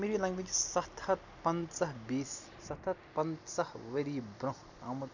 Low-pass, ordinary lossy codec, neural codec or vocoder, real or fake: 7.2 kHz; none; none; real